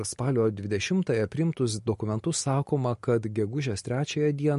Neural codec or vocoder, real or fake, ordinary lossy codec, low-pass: none; real; MP3, 48 kbps; 14.4 kHz